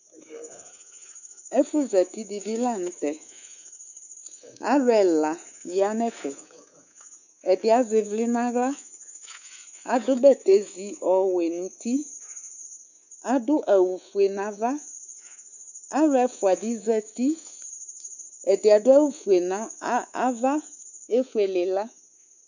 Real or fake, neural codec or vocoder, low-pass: fake; codec, 24 kHz, 3.1 kbps, DualCodec; 7.2 kHz